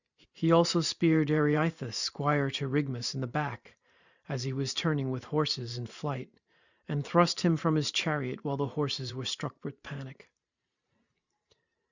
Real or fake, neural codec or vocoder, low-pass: fake; vocoder, 44.1 kHz, 128 mel bands every 512 samples, BigVGAN v2; 7.2 kHz